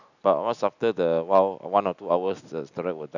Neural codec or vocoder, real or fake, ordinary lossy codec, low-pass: none; real; none; 7.2 kHz